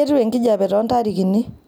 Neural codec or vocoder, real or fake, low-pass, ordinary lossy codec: none; real; none; none